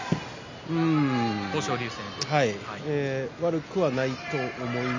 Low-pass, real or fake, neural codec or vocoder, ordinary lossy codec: 7.2 kHz; real; none; MP3, 64 kbps